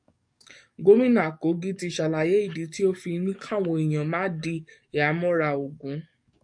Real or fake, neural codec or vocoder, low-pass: fake; codec, 44.1 kHz, 7.8 kbps, DAC; 9.9 kHz